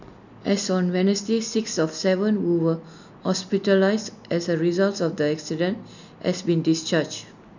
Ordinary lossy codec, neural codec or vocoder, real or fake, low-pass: none; none; real; 7.2 kHz